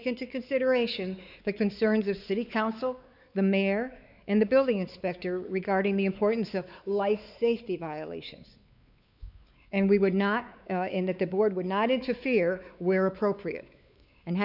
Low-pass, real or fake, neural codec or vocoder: 5.4 kHz; fake; codec, 16 kHz, 4 kbps, X-Codec, WavLM features, trained on Multilingual LibriSpeech